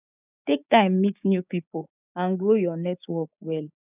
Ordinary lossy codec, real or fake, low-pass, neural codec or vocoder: none; fake; 3.6 kHz; codec, 16 kHz, 6 kbps, DAC